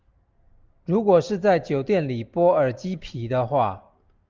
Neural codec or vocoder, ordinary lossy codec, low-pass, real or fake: none; Opus, 24 kbps; 7.2 kHz; real